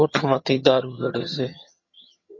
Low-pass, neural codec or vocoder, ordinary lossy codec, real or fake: 7.2 kHz; vocoder, 22.05 kHz, 80 mel bands, HiFi-GAN; MP3, 32 kbps; fake